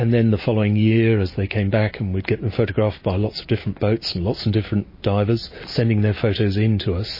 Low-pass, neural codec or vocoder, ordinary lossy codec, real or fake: 5.4 kHz; none; MP3, 24 kbps; real